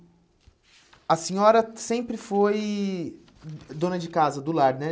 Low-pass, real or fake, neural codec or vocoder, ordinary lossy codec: none; real; none; none